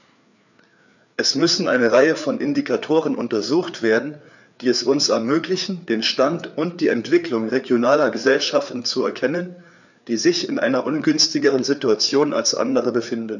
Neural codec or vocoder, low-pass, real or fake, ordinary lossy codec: codec, 16 kHz, 4 kbps, FreqCodec, larger model; 7.2 kHz; fake; none